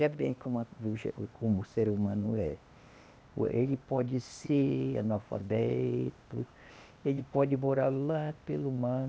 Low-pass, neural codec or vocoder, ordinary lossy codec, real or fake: none; codec, 16 kHz, 0.8 kbps, ZipCodec; none; fake